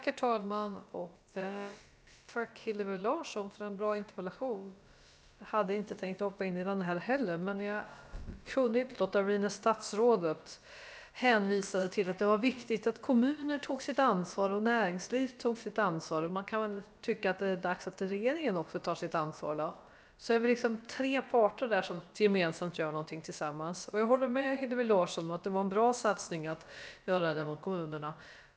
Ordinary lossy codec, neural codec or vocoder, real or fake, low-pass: none; codec, 16 kHz, about 1 kbps, DyCAST, with the encoder's durations; fake; none